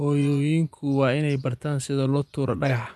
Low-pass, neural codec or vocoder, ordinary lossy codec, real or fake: none; vocoder, 24 kHz, 100 mel bands, Vocos; none; fake